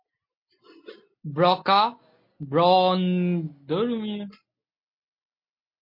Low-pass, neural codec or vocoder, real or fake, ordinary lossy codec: 5.4 kHz; none; real; MP3, 32 kbps